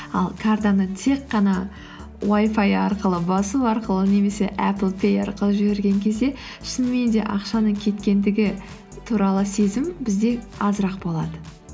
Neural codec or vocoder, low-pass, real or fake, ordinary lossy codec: none; none; real; none